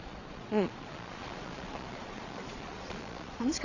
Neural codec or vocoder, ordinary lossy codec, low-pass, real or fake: vocoder, 22.05 kHz, 80 mel bands, Vocos; none; 7.2 kHz; fake